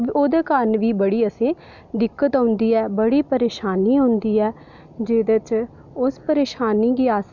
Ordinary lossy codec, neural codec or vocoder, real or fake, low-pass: none; none; real; 7.2 kHz